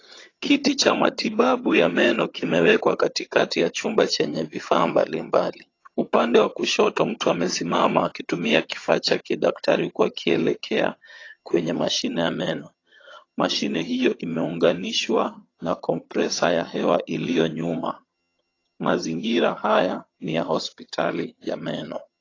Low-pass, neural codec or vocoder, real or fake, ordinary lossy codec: 7.2 kHz; vocoder, 22.05 kHz, 80 mel bands, HiFi-GAN; fake; AAC, 32 kbps